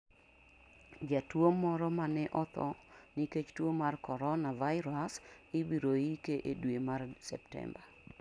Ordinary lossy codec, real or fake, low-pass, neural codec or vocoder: none; real; 9.9 kHz; none